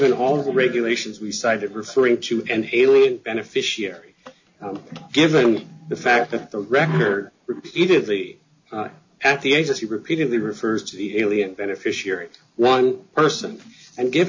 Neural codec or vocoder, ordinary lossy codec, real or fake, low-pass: none; MP3, 48 kbps; real; 7.2 kHz